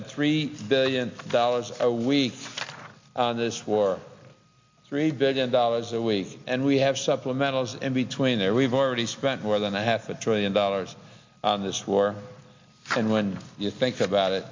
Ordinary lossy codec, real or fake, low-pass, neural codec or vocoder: MP3, 48 kbps; real; 7.2 kHz; none